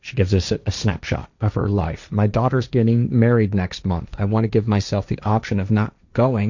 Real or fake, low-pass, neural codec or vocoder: fake; 7.2 kHz; codec, 16 kHz, 1.1 kbps, Voila-Tokenizer